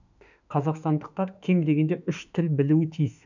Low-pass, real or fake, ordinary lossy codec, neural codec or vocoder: 7.2 kHz; fake; none; autoencoder, 48 kHz, 32 numbers a frame, DAC-VAE, trained on Japanese speech